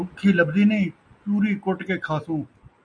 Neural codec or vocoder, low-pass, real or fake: none; 9.9 kHz; real